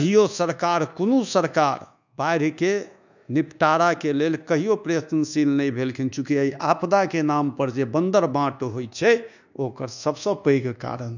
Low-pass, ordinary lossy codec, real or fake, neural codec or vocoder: 7.2 kHz; none; fake; codec, 24 kHz, 1.2 kbps, DualCodec